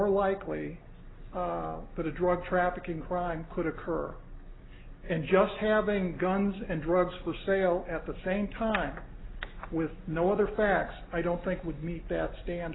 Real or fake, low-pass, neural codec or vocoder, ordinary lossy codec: real; 7.2 kHz; none; AAC, 16 kbps